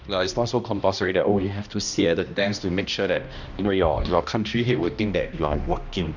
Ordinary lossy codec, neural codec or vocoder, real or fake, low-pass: Opus, 64 kbps; codec, 16 kHz, 1 kbps, X-Codec, HuBERT features, trained on balanced general audio; fake; 7.2 kHz